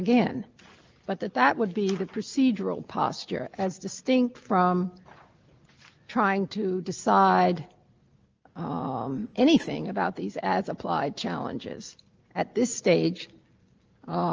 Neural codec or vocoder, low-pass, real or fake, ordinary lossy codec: none; 7.2 kHz; real; Opus, 24 kbps